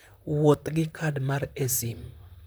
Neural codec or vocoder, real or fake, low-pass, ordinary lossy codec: vocoder, 44.1 kHz, 128 mel bands, Pupu-Vocoder; fake; none; none